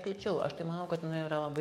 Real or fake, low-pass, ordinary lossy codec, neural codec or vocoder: fake; 19.8 kHz; MP3, 64 kbps; codec, 44.1 kHz, 7.8 kbps, DAC